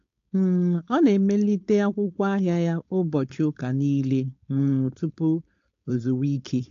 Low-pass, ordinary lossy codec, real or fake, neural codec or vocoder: 7.2 kHz; AAC, 48 kbps; fake; codec, 16 kHz, 4.8 kbps, FACodec